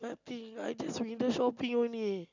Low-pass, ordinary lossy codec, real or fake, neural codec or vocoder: 7.2 kHz; none; real; none